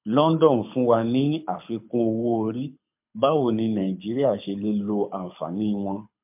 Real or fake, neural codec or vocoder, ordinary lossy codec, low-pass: fake; codec, 24 kHz, 6 kbps, HILCodec; MP3, 32 kbps; 3.6 kHz